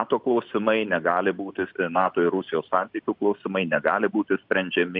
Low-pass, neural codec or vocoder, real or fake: 5.4 kHz; none; real